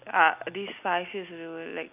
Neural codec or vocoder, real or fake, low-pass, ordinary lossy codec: none; real; 3.6 kHz; none